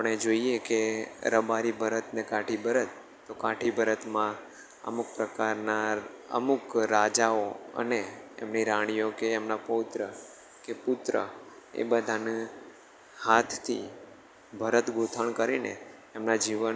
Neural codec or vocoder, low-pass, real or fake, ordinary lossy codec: none; none; real; none